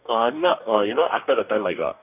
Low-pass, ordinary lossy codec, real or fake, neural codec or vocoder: 3.6 kHz; none; fake; codec, 44.1 kHz, 2.6 kbps, DAC